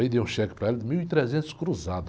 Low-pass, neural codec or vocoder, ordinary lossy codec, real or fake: none; none; none; real